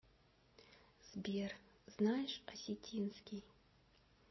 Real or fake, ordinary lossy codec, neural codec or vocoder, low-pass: real; MP3, 24 kbps; none; 7.2 kHz